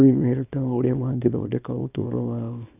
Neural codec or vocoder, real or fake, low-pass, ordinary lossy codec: codec, 24 kHz, 0.9 kbps, WavTokenizer, small release; fake; 3.6 kHz; none